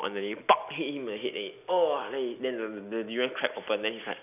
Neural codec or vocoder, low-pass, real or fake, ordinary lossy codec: none; 3.6 kHz; real; none